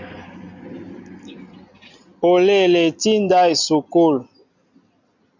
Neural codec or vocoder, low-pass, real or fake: none; 7.2 kHz; real